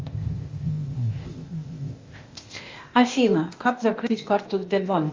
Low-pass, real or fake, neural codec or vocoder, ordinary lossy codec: 7.2 kHz; fake; codec, 16 kHz, 0.8 kbps, ZipCodec; Opus, 32 kbps